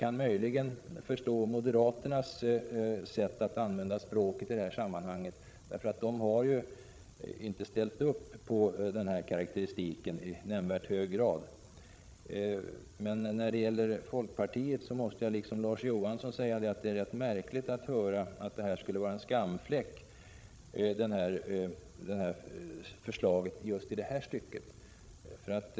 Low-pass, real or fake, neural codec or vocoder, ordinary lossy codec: none; fake; codec, 16 kHz, 16 kbps, FreqCodec, larger model; none